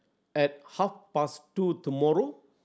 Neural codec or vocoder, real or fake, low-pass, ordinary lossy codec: none; real; none; none